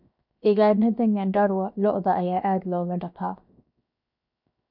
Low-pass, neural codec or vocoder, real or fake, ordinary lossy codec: 5.4 kHz; codec, 16 kHz, 0.7 kbps, FocalCodec; fake; none